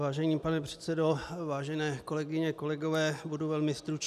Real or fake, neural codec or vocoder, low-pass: real; none; 14.4 kHz